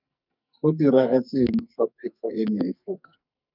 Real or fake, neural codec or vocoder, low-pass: fake; codec, 44.1 kHz, 2.6 kbps, SNAC; 5.4 kHz